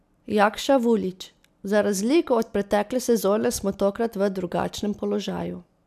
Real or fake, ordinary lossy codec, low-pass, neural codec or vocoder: real; none; 14.4 kHz; none